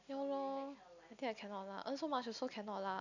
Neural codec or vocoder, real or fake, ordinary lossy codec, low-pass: none; real; none; 7.2 kHz